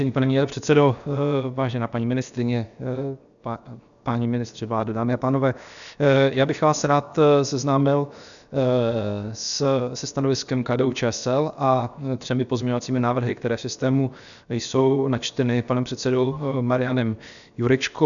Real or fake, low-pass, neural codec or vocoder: fake; 7.2 kHz; codec, 16 kHz, 0.7 kbps, FocalCodec